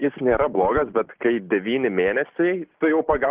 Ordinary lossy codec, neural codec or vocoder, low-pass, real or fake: Opus, 16 kbps; none; 3.6 kHz; real